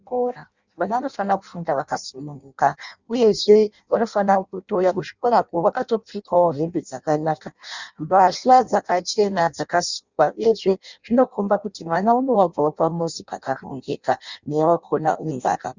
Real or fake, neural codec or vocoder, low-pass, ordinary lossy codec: fake; codec, 16 kHz in and 24 kHz out, 0.6 kbps, FireRedTTS-2 codec; 7.2 kHz; Opus, 64 kbps